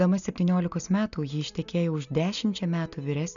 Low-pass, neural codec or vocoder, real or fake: 7.2 kHz; none; real